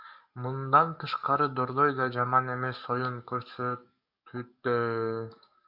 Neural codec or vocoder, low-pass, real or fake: codec, 44.1 kHz, 7.8 kbps, DAC; 5.4 kHz; fake